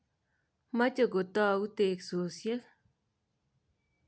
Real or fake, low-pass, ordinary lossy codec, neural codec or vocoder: real; none; none; none